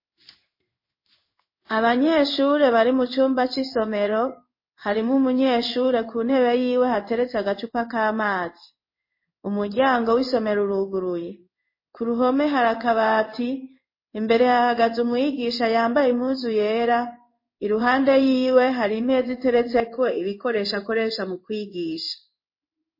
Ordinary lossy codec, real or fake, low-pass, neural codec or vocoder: MP3, 24 kbps; fake; 5.4 kHz; codec, 16 kHz in and 24 kHz out, 1 kbps, XY-Tokenizer